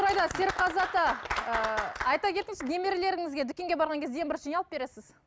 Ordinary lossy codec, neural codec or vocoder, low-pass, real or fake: none; none; none; real